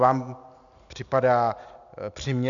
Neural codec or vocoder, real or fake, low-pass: none; real; 7.2 kHz